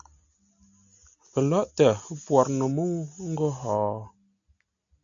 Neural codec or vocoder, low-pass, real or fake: none; 7.2 kHz; real